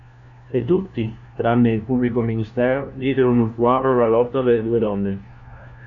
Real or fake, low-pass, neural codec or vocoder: fake; 7.2 kHz; codec, 16 kHz, 1 kbps, FunCodec, trained on LibriTTS, 50 frames a second